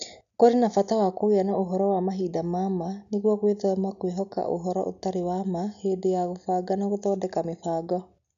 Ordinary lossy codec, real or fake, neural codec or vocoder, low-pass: none; real; none; 7.2 kHz